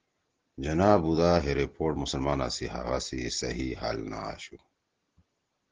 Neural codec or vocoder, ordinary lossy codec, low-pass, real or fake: none; Opus, 16 kbps; 7.2 kHz; real